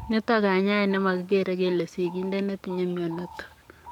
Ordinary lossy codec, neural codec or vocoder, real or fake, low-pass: none; codec, 44.1 kHz, 7.8 kbps, DAC; fake; 19.8 kHz